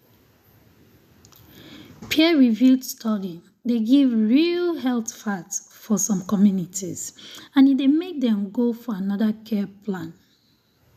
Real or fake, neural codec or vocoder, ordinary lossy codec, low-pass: real; none; none; 14.4 kHz